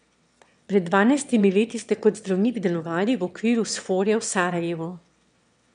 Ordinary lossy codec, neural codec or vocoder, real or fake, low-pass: none; autoencoder, 22.05 kHz, a latent of 192 numbers a frame, VITS, trained on one speaker; fake; 9.9 kHz